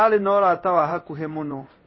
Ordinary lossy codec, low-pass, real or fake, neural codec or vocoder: MP3, 24 kbps; 7.2 kHz; fake; codec, 16 kHz in and 24 kHz out, 1 kbps, XY-Tokenizer